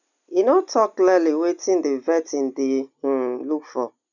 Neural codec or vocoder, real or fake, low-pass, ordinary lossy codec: none; real; 7.2 kHz; none